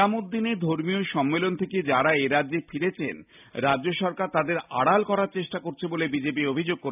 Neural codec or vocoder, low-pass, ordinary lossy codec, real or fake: none; 3.6 kHz; none; real